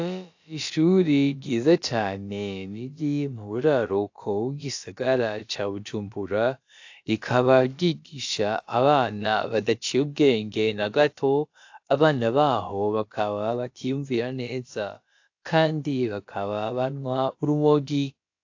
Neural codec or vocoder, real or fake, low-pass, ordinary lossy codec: codec, 16 kHz, about 1 kbps, DyCAST, with the encoder's durations; fake; 7.2 kHz; AAC, 48 kbps